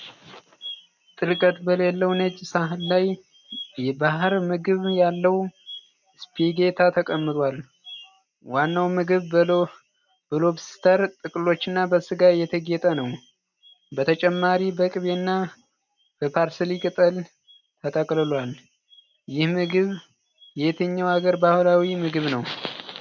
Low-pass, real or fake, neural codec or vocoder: 7.2 kHz; real; none